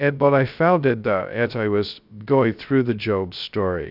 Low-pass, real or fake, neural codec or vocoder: 5.4 kHz; fake; codec, 16 kHz, 0.2 kbps, FocalCodec